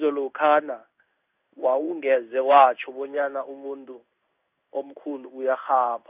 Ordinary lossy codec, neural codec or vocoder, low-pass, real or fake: none; codec, 16 kHz in and 24 kHz out, 1 kbps, XY-Tokenizer; 3.6 kHz; fake